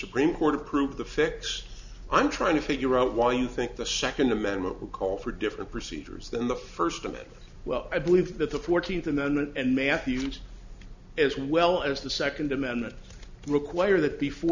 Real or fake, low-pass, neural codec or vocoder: real; 7.2 kHz; none